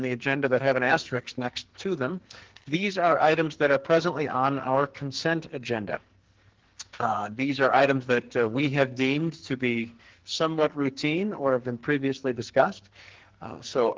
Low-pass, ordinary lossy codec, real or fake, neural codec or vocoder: 7.2 kHz; Opus, 16 kbps; fake; codec, 44.1 kHz, 2.6 kbps, SNAC